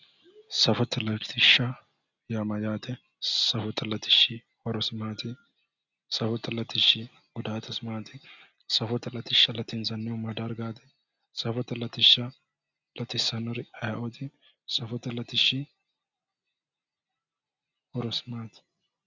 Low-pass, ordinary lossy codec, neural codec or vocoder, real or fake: 7.2 kHz; Opus, 64 kbps; none; real